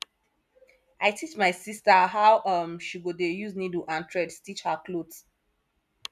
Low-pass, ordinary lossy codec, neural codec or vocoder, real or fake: 14.4 kHz; none; none; real